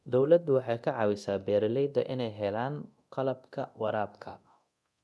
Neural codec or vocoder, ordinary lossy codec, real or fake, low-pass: codec, 24 kHz, 0.9 kbps, DualCodec; none; fake; none